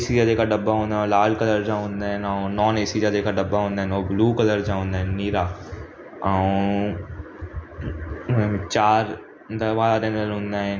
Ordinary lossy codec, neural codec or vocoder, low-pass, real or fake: none; none; none; real